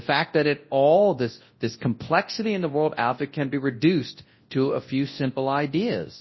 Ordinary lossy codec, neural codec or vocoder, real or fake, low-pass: MP3, 24 kbps; codec, 24 kHz, 0.9 kbps, WavTokenizer, large speech release; fake; 7.2 kHz